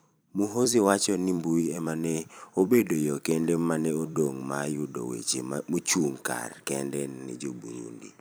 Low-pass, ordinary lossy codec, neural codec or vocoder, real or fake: none; none; vocoder, 44.1 kHz, 128 mel bands every 256 samples, BigVGAN v2; fake